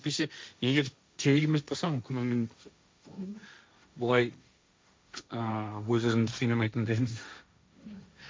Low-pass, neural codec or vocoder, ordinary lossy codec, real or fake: none; codec, 16 kHz, 1.1 kbps, Voila-Tokenizer; none; fake